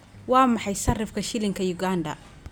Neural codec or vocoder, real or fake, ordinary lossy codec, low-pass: none; real; none; none